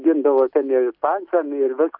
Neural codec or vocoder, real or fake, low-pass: none; real; 5.4 kHz